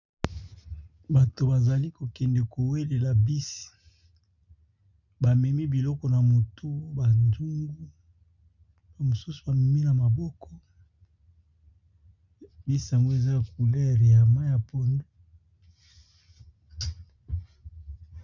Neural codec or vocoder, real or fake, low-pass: none; real; 7.2 kHz